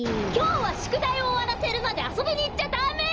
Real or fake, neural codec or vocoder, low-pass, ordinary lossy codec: real; none; 7.2 kHz; Opus, 24 kbps